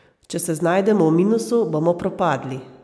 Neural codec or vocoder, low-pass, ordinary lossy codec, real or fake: none; none; none; real